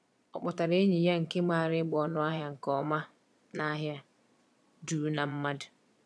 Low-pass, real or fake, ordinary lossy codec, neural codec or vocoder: none; fake; none; vocoder, 22.05 kHz, 80 mel bands, Vocos